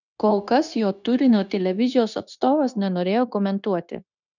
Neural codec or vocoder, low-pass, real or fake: codec, 16 kHz, 0.9 kbps, LongCat-Audio-Codec; 7.2 kHz; fake